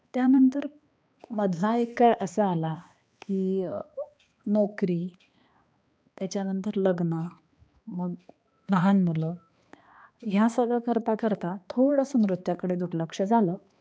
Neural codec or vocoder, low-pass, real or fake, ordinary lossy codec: codec, 16 kHz, 2 kbps, X-Codec, HuBERT features, trained on balanced general audio; none; fake; none